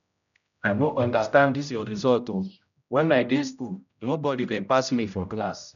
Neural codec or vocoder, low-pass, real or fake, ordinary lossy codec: codec, 16 kHz, 0.5 kbps, X-Codec, HuBERT features, trained on general audio; 7.2 kHz; fake; none